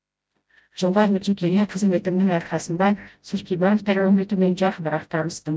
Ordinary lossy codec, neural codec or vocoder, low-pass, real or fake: none; codec, 16 kHz, 0.5 kbps, FreqCodec, smaller model; none; fake